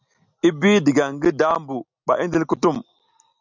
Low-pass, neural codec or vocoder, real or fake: 7.2 kHz; none; real